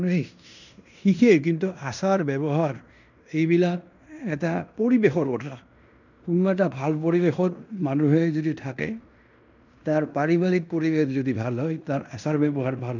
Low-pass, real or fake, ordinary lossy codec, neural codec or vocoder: 7.2 kHz; fake; none; codec, 16 kHz in and 24 kHz out, 0.9 kbps, LongCat-Audio-Codec, fine tuned four codebook decoder